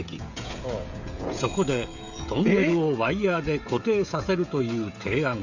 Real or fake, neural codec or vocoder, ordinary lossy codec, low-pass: fake; codec, 16 kHz, 16 kbps, FreqCodec, smaller model; none; 7.2 kHz